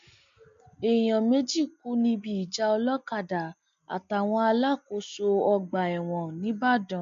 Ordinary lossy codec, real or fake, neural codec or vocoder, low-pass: MP3, 48 kbps; real; none; 7.2 kHz